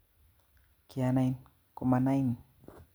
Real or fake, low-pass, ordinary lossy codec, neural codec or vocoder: real; none; none; none